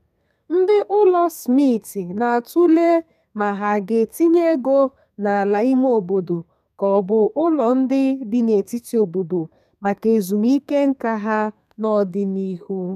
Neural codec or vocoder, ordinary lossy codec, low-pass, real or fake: codec, 32 kHz, 1.9 kbps, SNAC; none; 14.4 kHz; fake